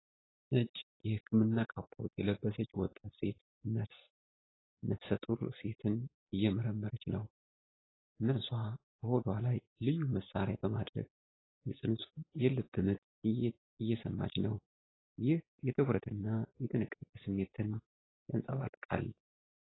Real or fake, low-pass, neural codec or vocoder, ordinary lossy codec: real; 7.2 kHz; none; AAC, 16 kbps